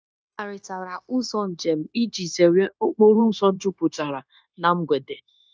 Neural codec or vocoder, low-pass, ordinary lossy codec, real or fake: codec, 16 kHz, 0.9 kbps, LongCat-Audio-Codec; none; none; fake